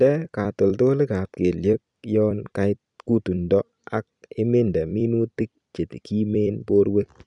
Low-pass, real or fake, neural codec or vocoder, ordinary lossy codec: 10.8 kHz; fake; vocoder, 44.1 kHz, 128 mel bands every 256 samples, BigVGAN v2; none